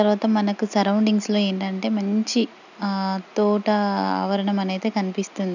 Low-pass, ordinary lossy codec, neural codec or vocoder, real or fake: 7.2 kHz; none; none; real